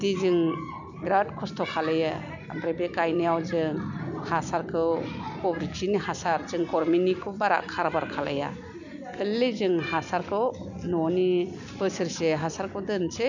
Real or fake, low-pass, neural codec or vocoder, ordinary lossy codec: real; 7.2 kHz; none; none